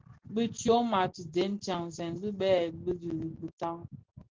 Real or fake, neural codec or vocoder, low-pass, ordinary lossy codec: real; none; 7.2 kHz; Opus, 16 kbps